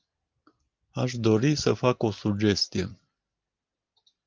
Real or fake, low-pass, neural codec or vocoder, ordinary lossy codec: real; 7.2 kHz; none; Opus, 32 kbps